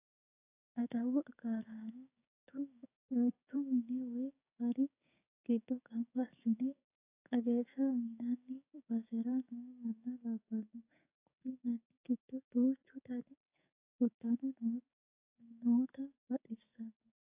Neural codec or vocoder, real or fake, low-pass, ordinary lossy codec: codec, 16 kHz, 6 kbps, DAC; fake; 3.6 kHz; AAC, 32 kbps